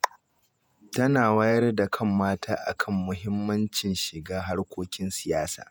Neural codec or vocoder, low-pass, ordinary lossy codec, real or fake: vocoder, 48 kHz, 128 mel bands, Vocos; none; none; fake